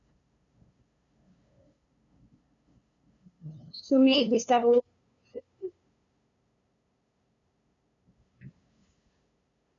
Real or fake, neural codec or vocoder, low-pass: fake; codec, 16 kHz, 2 kbps, FunCodec, trained on LibriTTS, 25 frames a second; 7.2 kHz